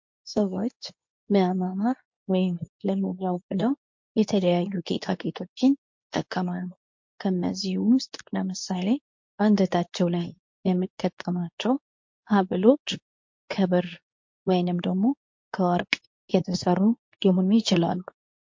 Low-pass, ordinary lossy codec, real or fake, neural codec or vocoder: 7.2 kHz; MP3, 48 kbps; fake; codec, 24 kHz, 0.9 kbps, WavTokenizer, medium speech release version 2